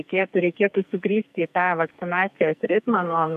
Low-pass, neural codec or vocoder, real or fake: 14.4 kHz; codec, 44.1 kHz, 2.6 kbps, SNAC; fake